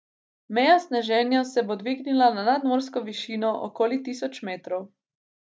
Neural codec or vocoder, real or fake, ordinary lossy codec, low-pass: none; real; none; none